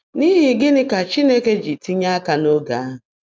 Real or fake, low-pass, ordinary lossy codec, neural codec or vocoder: real; none; none; none